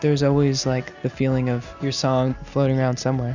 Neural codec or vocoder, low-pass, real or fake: none; 7.2 kHz; real